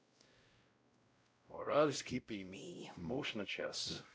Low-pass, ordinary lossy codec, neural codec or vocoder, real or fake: none; none; codec, 16 kHz, 0.5 kbps, X-Codec, WavLM features, trained on Multilingual LibriSpeech; fake